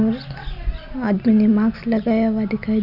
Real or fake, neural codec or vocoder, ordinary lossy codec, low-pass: real; none; none; 5.4 kHz